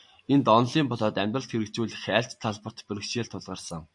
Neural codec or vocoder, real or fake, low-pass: none; real; 10.8 kHz